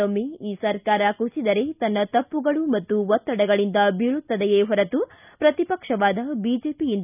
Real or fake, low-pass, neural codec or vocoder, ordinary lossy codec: real; 3.6 kHz; none; none